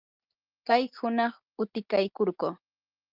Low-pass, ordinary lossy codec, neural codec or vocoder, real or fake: 5.4 kHz; Opus, 32 kbps; none; real